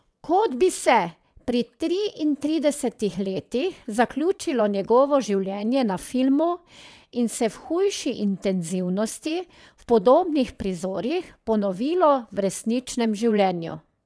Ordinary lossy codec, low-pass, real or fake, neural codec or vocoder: none; none; fake; vocoder, 22.05 kHz, 80 mel bands, WaveNeXt